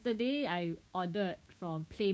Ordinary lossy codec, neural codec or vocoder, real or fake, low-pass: none; codec, 16 kHz, 6 kbps, DAC; fake; none